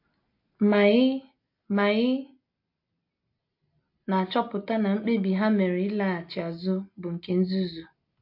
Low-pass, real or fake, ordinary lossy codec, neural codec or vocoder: 5.4 kHz; real; MP3, 32 kbps; none